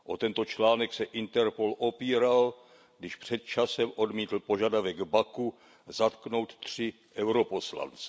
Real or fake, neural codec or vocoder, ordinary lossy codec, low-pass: real; none; none; none